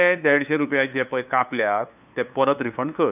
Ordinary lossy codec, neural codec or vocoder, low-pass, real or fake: none; codec, 16 kHz, 2 kbps, FunCodec, trained on LibriTTS, 25 frames a second; 3.6 kHz; fake